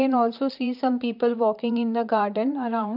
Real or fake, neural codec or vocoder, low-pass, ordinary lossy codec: fake; vocoder, 44.1 kHz, 128 mel bands, Pupu-Vocoder; 5.4 kHz; none